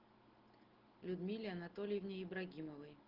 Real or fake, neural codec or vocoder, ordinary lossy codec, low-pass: real; none; Opus, 16 kbps; 5.4 kHz